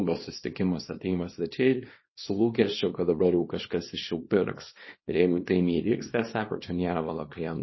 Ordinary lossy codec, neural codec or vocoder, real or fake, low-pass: MP3, 24 kbps; codec, 24 kHz, 0.9 kbps, WavTokenizer, small release; fake; 7.2 kHz